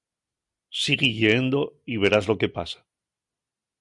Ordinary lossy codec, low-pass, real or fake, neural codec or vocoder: Opus, 64 kbps; 10.8 kHz; real; none